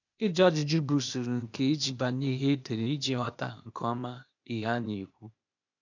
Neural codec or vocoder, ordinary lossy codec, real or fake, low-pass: codec, 16 kHz, 0.8 kbps, ZipCodec; none; fake; 7.2 kHz